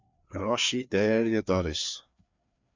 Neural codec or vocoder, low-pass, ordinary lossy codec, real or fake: codec, 16 kHz, 2 kbps, FreqCodec, larger model; 7.2 kHz; MP3, 64 kbps; fake